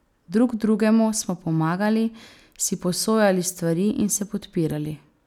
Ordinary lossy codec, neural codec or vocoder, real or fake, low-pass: none; none; real; 19.8 kHz